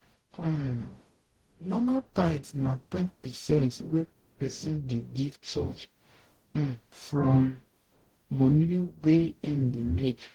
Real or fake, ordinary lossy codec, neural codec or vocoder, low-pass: fake; Opus, 16 kbps; codec, 44.1 kHz, 0.9 kbps, DAC; 19.8 kHz